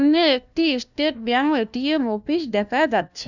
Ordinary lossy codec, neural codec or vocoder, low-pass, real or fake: none; codec, 16 kHz, 1 kbps, FunCodec, trained on LibriTTS, 50 frames a second; 7.2 kHz; fake